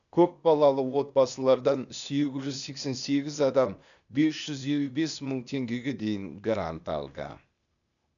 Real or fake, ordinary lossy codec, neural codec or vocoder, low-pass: fake; none; codec, 16 kHz, 0.8 kbps, ZipCodec; 7.2 kHz